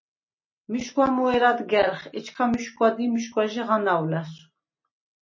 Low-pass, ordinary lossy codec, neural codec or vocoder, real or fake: 7.2 kHz; MP3, 32 kbps; none; real